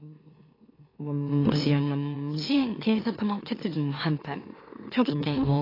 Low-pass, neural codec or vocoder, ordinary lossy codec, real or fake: 5.4 kHz; autoencoder, 44.1 kHz, a latent of 192 numbers a frame, MeloTTS; AAC, 24 kbps; fake